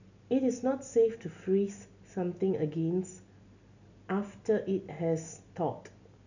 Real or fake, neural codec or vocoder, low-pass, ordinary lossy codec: real; none; 7.2 kHz; none